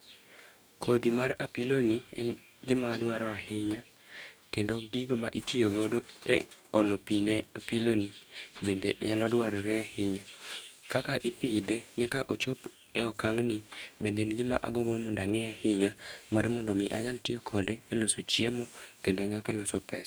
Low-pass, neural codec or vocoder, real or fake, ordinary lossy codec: none; codec, 44.1 kHz, 2.6 kbps, DAC; fake; none